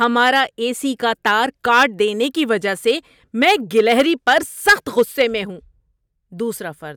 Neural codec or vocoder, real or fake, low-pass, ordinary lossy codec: none; real; 19.8 kHz; none